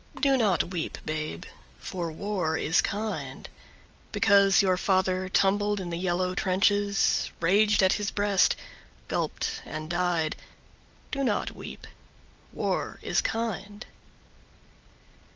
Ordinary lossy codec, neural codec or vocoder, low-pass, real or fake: Opus, 24 kbps; none; 7.2 kHz; real